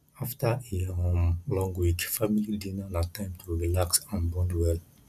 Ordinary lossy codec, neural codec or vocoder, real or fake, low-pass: none; none; real; 14.4 kHz